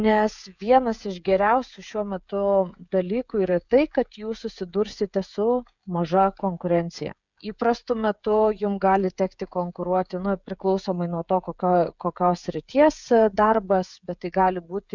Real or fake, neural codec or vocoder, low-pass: fake; codec, 16 kHz, 16 kbps, FreqCodec, smaller model; 7.2 kHz